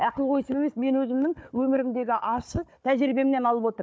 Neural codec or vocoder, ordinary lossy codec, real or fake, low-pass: codec, 16 kHz, 4 kbps, FunCodec, trained on Chinese and English, 50 frames a second; none; fake; none